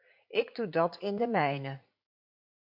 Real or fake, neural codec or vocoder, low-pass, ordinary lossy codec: fake; vocoder, 44.1 kHz, 80 mel bands, Vocos; 5.4 kHz; AAC, 48 kbps